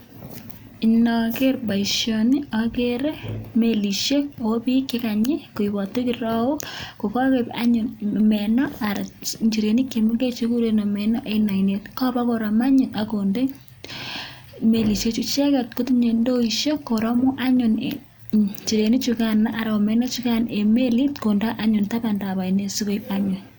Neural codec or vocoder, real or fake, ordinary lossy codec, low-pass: none; real; none; none